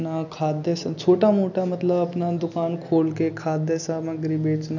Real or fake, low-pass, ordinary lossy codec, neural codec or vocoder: real; 7.2 kHz; none; none